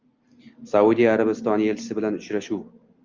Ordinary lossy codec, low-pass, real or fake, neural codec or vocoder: Opus, 32 kbps; 7.2 kHz; real; none